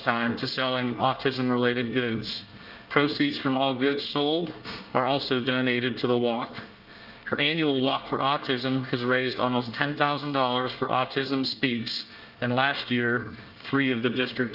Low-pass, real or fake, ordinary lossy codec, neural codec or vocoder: 5.4 kHz; fake; Opus, 24 kbps; codec, 24 kHz, 1 kbps, SNAC